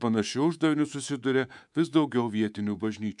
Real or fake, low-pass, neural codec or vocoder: fake; 10.8 kHz; codec, 24 kHz, 3.1 kbps, DualCodec